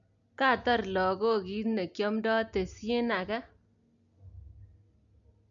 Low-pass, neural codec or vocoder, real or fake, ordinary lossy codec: 7.2 kHz; none; real; none